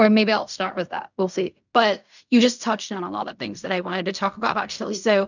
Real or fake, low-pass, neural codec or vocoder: fake; 7.2 kHz; codec, 16 kHz in and 24 kHz out, 0.4 kbps, LongCat-Audio-Codec, fine tuned four codebook decoder